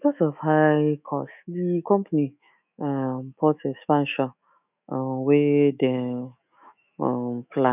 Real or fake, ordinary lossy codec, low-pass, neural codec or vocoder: fake; none; 3.6 kHz; autoencoder, 48 kHz, 128 numbers a frame, DAC-VAE, trained on Japanese speech